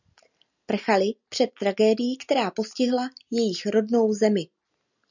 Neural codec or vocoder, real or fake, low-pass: none; real; 7.2 kHz